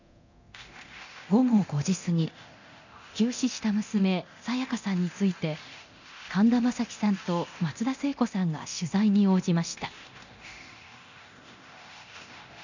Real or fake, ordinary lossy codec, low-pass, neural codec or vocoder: fake; none; 7.2 kHz; codec, 24 kHz, 0.9 kbps, DualCodec